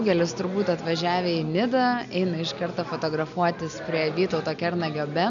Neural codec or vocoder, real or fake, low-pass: none; real; 7.2 kHz